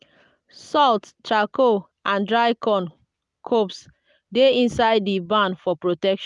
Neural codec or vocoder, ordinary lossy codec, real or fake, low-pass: none; none; real; 10.8 kHz